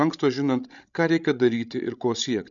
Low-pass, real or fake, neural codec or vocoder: 7.2 kHz; fake; codec, 16 kHz, 16 kbps, FreqCodec, larger model